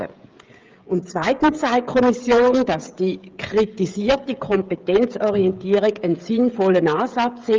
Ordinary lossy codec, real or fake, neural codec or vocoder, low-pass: Opus, 32 kbps; fake; codec, 16 kHz, 16 kbps, FreqCodec, smaller model; 7.2 kHz